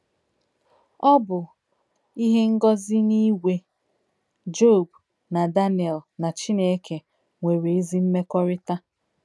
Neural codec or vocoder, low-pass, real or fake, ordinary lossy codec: none; none; real; none